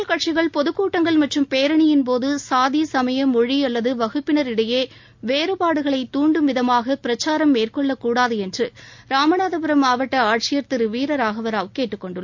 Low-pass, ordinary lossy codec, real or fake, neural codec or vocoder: 7.2 kHz; MP3, 64 kbps; real; none